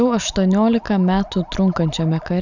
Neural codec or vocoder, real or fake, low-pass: none; real; 7.2 kHz